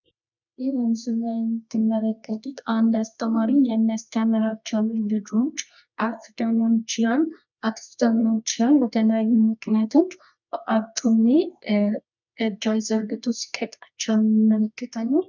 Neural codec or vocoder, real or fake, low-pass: codec, 24 kHz, 0.9 kbps, WavTokenizer, medium music audio release; fake; 7.2 kHz